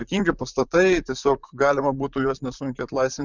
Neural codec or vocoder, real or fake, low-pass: vocoder, 44.1 kHz, 128 mel bands, Pupu-Vocoder; fake; 7.2 kHz